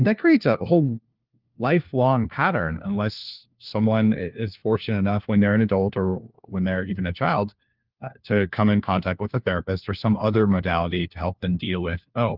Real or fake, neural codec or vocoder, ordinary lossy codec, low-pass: fake; codec, 16 kHz, 1 kbps, FunCodec, trained on LibriTTS, 50 frames a second; Opus, 32 kbps; 5.4 kHz